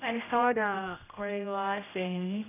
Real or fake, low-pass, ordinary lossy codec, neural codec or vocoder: fake; 3.6 kHz; none; codec, 16 kHz, 0.5 kbps, X-Codec, HuBERT features, trained on general audio